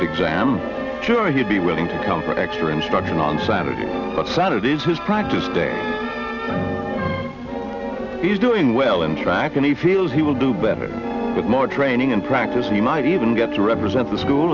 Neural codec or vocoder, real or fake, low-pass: none; real; 7.2 kHz